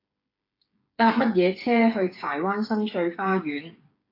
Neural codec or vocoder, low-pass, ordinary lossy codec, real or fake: codec, 16 kHz, 8 kbps, FreqCodec, smaller model; 5.4 kHz; AAC, 32 kbps; fake